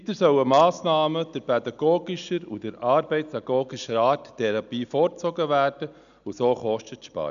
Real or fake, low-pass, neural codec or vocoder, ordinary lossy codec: real; 7.2 kHz; none; none